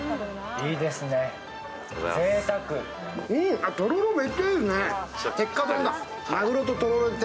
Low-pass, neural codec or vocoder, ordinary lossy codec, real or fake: none; none; none; real